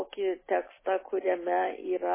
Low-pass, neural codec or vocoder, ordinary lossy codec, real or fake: 3.6 kHz; none; MP3, 16 kbps; real